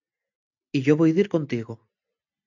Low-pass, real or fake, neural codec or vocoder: 7.2 kHz; real; none